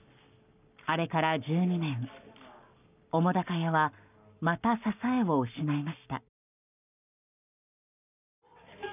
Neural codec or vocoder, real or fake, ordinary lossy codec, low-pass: codec, 44.1 kHz, 7.8 kbps, Pupu-Codec; fake; none; 3.6 kHz